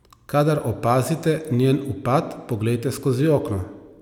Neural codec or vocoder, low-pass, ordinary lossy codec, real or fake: none; 19.8 kHz; none; real